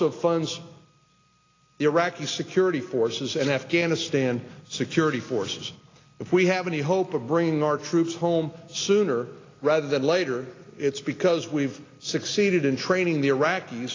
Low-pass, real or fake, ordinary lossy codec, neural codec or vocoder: 7.2 kHz; real; AAC, 32 kbps; none